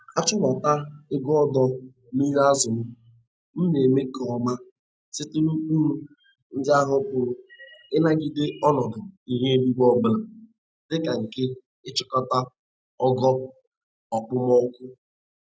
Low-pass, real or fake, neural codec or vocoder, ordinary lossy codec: none; real; none; none